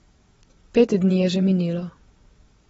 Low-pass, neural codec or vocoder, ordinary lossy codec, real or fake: 9.9 kHz; vocoder, 22.05 kHz, 80 mel bands, WaveNeXt; AAC, 24 kbps; fake